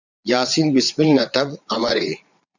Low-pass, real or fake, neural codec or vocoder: 7.2 kHz; fake; vocoder, 22.05 kHz, 80 mel bands, Vocos